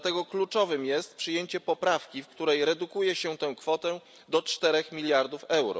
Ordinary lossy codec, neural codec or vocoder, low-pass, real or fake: none; none; none; real